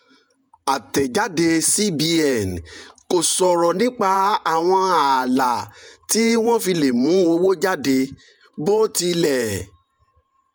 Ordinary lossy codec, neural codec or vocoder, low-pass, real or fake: none; vocoder, 48 kHz, 128 mel bands, Vocos; none; fake